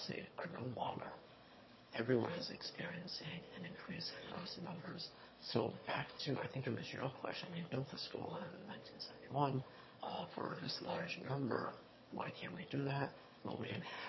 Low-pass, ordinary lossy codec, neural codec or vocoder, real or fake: 7.2 kHz; MP3, 24 kbps; autoencoder, 22.05 kHz, a latent of 192 numbers a frame, VITS, trained on one speaker; fake